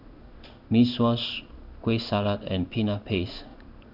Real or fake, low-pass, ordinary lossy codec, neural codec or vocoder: fake; 5.4 kHz; none; codec, 16 kHz in and 24 kHz out, 1 kbps, XY-Tokenizer